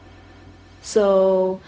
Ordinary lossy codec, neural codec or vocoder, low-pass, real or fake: none; codec, 16 kHz, 0.4 kbps, LongCat-Audio-Codec; none; fake